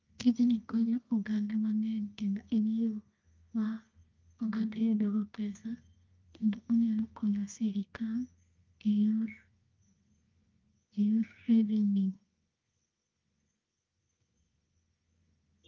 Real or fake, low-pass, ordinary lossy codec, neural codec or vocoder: fake; 7.2 kHz; Opus, 32 kbps; codec, 24 kHz, 0.9 kbps, WavTokenizer, medium music audio release